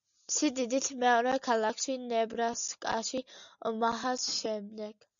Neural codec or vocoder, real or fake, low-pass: none; real; 7.2 kHz